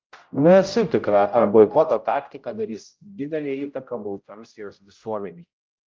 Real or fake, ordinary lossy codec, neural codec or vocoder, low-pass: fake; Opus, 24 kbps; codec, 16 kHz, 0.5 kbps, X-Codec, HuBERT features, trained on general audio; 7.2 kHz